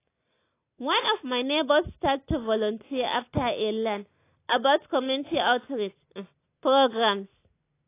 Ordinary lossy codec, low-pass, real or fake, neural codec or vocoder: AAC, 24 kbps; 3.6 kHz; real; none